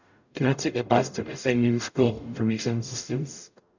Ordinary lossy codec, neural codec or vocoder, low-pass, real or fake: none; codec, 44.1 kHz, 0.9 kbps, DAC; 7.2 kHz; fake